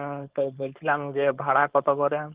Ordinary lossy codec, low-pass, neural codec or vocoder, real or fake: Opus, 16 kbps; 3.6 kHz; codec, 16 kHz, 4.8 kbps, FACodec; fake